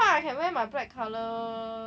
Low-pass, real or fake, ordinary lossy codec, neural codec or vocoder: none; real; none; none